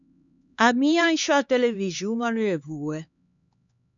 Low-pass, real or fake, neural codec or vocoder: 7.2 kHz; fake; codec, 16 kHz, 4 kbps, X-Codec, HuBERT features, trained on LibriSpeech